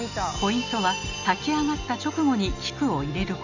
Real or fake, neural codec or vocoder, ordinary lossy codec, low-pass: real; none; none; 7.2 kHz